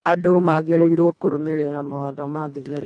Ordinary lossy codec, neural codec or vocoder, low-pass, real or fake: none; codec, 24 kHz, 1.5 kbps, HILCodec; 9.9 kHz; fake